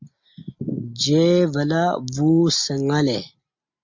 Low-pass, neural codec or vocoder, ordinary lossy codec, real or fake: 7.2 kHz; none; MP3, 48 kbps; real